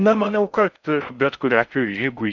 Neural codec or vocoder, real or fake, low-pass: codec, 16 kHz in and 24 kHz out, 0.6 kbps, FocalCodec, streaming, 4096 codes; fake; 7.2 kHz